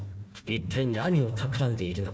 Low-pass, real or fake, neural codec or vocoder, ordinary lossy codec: none; fake; codec, 16 kHz, 1 kbps, FunCodec, trained on Chinese and English, 50 frames a second; none